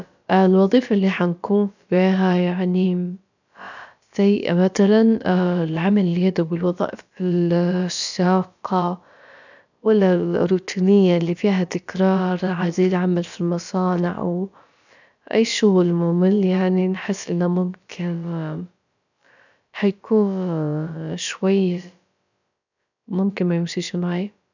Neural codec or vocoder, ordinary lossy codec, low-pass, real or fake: codec, 16 kHz, about 1 kbps, DyCAST, with the encoder's durations; none; 7.2 kHz; fake